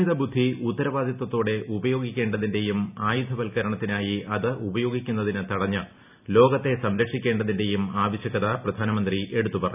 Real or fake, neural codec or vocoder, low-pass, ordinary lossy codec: real; none; 3.6 kHz; none